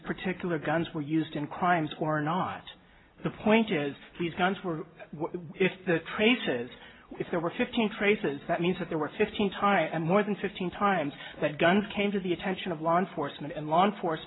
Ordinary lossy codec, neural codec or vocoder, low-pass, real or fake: AAC, 16 kbps; none; 7.2 kHz; real